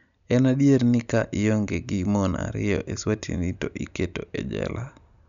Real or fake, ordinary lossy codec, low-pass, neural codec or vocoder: real; none; 7.2 kHz; none